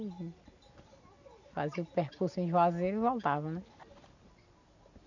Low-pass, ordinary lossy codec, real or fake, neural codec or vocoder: 7.2 kHz; none; real; none